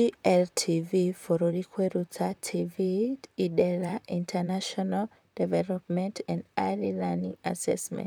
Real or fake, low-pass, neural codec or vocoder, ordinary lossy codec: fake; none; vocoder, 44.1 kHz, 128 mel bands, Pupu-Vocoder; none